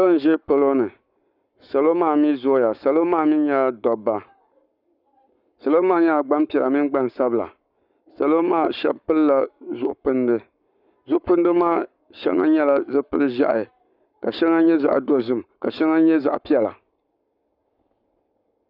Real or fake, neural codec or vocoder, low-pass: fake; codec, 44.1 kHz, 7.8 kbps, Pupu-Codec; 5.4 kHz